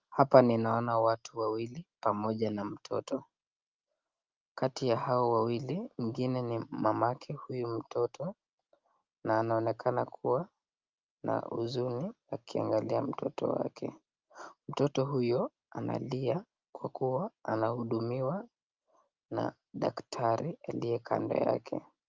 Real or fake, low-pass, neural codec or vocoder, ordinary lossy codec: real; 7.2 kHz; none; Opus, 24 kbps